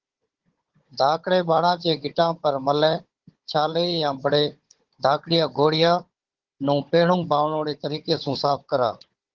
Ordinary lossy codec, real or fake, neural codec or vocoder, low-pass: Opus, 16 kbps; fake; codec, 16 kHz, 16 kbps, FunCodec, trained on Chinese and English, 50 frames a second; 7.2 kHz